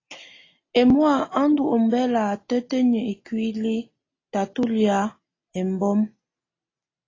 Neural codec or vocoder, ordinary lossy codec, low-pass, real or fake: none; AAC, 32 kbps; 7.2 kHz; real